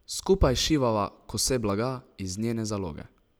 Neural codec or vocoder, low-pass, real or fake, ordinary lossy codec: none; none; real; none